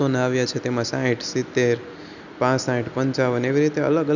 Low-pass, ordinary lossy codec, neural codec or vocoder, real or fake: 7.2 kHz; none; none; real